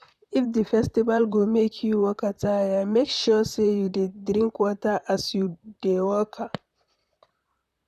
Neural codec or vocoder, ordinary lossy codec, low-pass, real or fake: vocoder, 44.1 kHz, 128 mel bands, Pupu-Vocoder; none; 14.4 kHz; fake